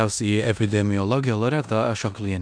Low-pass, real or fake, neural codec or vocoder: 9.9 kHz; fake; codec, 16 kHz in and 24 kHz out, 0.9 kbps, LongCat-Audio-Codec, four codebook decoder